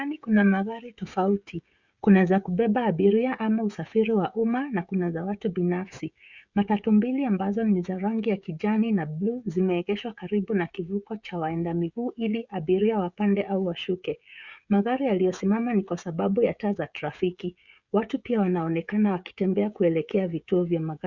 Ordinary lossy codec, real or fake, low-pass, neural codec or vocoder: Opus, 64 kbps; fake; 7.2 kHz; codec, 16 kHz, 16 kbps, FreqCodec, smaller model